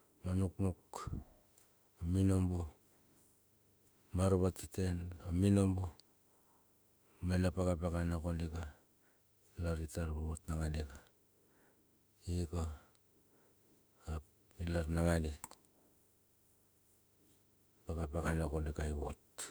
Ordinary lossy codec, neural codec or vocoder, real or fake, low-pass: none; autoencoder, 48 kHz, 32 numbers a frame, DAC-VAE, trained on Japanese speech; fake; none